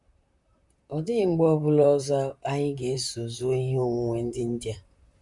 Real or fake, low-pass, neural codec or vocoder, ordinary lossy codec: fake; 10.8 kHz; vocoder, 44.1 kHz, 128 mel bands, Pupu-Vocoder; none